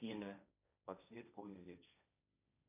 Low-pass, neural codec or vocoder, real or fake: 3.6 kHz; codec, 16 kHz, 1.1 kbps, Voila-Tokenizer; fake